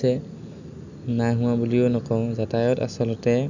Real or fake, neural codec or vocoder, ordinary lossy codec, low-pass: real; none; none; 7.2 kHz